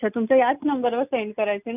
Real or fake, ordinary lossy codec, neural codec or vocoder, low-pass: real; none; none; 3.6 kHz